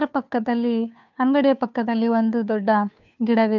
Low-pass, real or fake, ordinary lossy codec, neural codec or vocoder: 7.2 kHz; fake; none; codec, 16 kHz, 2 kbps, FunCodec, trained on Chinese and English, 25 frames a second